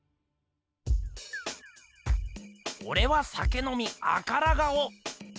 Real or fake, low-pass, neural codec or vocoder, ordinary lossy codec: real; none; none; none